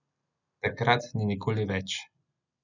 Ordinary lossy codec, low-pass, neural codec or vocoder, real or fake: none; 7.2 kHz; none; real